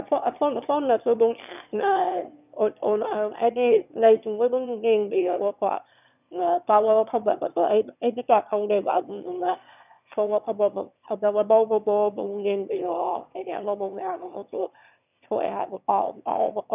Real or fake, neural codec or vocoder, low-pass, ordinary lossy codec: fake; autoencoder, 22.05 kHz, a latent of 192 numbers a frame, VITS, trained on one speaker; 3.6 kHz; none